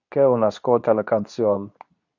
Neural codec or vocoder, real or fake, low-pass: codec, 24 kHz, 0.9 kbps, WavTokenizer, medium speech release version 2; fake; 7.2 kHz